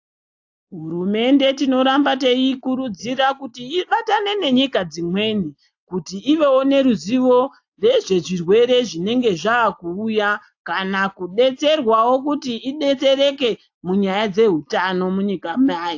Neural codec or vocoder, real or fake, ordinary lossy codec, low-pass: none; real; AAC, 48 kbps; 7.2 kHz